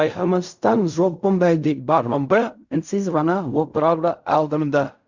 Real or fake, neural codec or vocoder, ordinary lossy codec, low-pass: fake; codec, 16 kHz in and 24 kHz out, 0.4 kbps, LongCat-Audio-Codec, fine tuned four codebook decoder; Opus, 64 kbps; 7.2 kHz